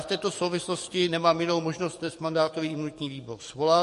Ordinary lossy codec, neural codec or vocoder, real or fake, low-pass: MP3, 48 kbps; codec, 44.1 kHz, 7.8 kbps, DAC; fake; 14.4 kHz